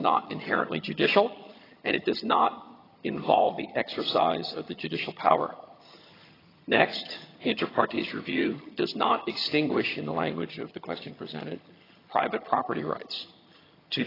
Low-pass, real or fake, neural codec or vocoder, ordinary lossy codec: 5.4 kHz; fake; vocoder, 22.05 kHz, 80 mel bands, HiFi-GAN; AAC, 24 kbps